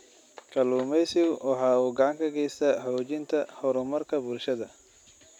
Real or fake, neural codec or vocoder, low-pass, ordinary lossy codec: real; none; 19.8 kHz; none